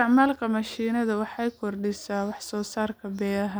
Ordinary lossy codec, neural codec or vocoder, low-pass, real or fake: none; none; none; real